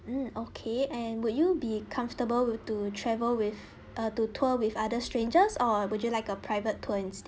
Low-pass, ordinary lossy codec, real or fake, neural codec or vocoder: none; none; real; none